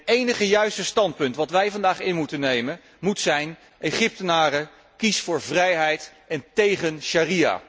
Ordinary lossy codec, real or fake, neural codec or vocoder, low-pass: none; real; none; none